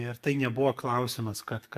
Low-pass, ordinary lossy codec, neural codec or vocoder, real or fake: 14.4 kHz; AAC, 96 kbps; codec, 44.1 kHz, 7.8 kbps, DAC; fake